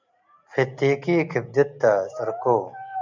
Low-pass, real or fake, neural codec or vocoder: 7.2 kHz; real; none